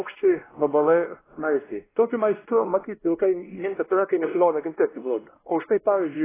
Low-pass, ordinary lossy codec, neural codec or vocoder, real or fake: 3.6 kHz; AAC, 16 kbps; codec, 16 kHz, 1 kbps, X-Codec, WavLM features, trained on Multilingual LibriSpeech; fake